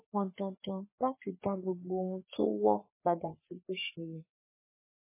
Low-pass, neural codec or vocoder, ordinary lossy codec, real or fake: 3.6 kHz; codec, 44.1 kHz, 3.4 kbps, Pupu-Codec; MP3, 16 kbps; fake